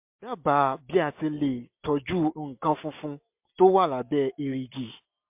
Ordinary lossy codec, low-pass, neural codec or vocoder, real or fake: MP3, 32 kbps; 3.6 kHz; none; real